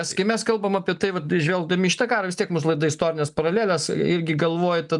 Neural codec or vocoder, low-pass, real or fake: none; 10.8 kHz; real